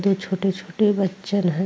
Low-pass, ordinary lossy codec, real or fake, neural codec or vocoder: none; none; real; none